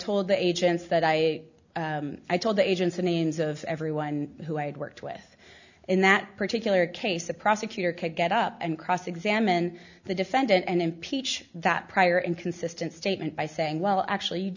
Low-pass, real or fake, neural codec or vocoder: 7.2 kHz; real; none